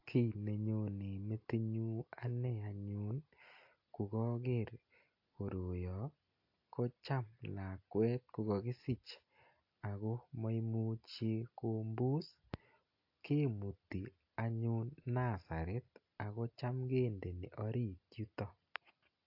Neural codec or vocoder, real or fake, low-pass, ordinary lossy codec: none; real; 5.4 kHz; AAC, 48 kbps